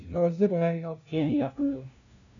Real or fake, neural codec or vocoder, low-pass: fake; codec, 16 kHz, 0.5 kbps, FunCodec, trained on LibriTTS, 25 frames a second; 7.2 kHz